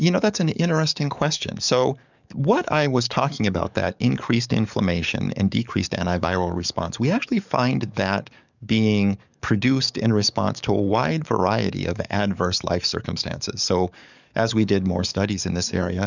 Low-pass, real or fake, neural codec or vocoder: 7.2 kHz; fake; codec, 44.1 kHz, 7.8 kbps, DAC